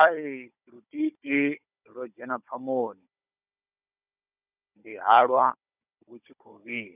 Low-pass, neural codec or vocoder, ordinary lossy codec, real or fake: 3.6 kHz; codec, 16 kHz, 16 kbps, FunCodec, trained on Chinese and English, 50 frames a second; none; fake